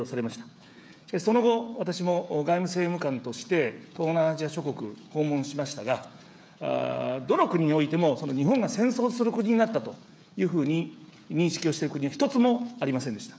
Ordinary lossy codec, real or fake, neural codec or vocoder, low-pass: none; fake; codec, 16 kHz, 16 kbps, FreqCodec, smaller model; none